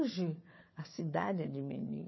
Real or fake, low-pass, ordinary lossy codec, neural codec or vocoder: fake; 7.2 kHz; MP3, 24 kbps; codec, 16 kHz, 4 kbps, FunCodec, trained on Chinese and English, 50 frames a second